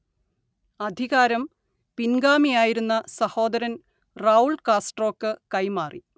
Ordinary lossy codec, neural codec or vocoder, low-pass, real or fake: none; none; none; real